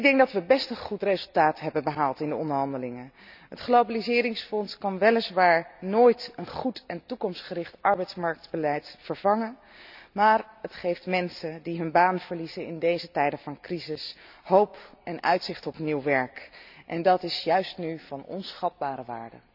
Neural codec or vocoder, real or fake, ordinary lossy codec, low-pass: none; real; none; 5.4 kHz